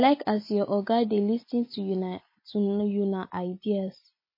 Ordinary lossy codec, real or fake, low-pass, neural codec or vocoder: MP3, 24 kbps; real; 5.4 kHz; none